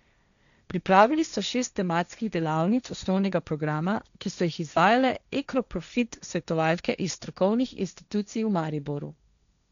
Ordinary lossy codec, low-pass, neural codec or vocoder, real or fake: none; 7.2 kHz; codec, 16 kHz, 1.1 kbps, Voila-Tokenizer; fake